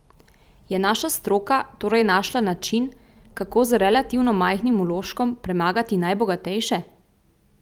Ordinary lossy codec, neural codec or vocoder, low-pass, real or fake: Opus, 32 kbps; vocoder, 44.1 kHz, 128 mel bands every 256 samples, BigVGAN v2; 19.8 kHz; fake